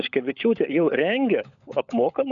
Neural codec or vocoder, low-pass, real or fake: codec, 16 kHz, 16 kbps, FunCodec, trained on LibriTTS, 50 frames a second; 7.2 kHz; fake